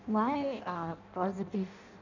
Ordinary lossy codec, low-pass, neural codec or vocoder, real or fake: none; 7.2 kHz; codec, 16 kHz in and 24 kHz out, 0.6 kbps, FireRedTTS-2 codec; fake